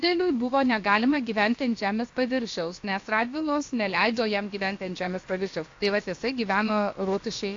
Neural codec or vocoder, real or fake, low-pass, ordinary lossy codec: codec, 16 kHz, about 1 kbps, DyCAST, with the encoder's durations; fake; 7.2 kHz; AAC, 48 kbps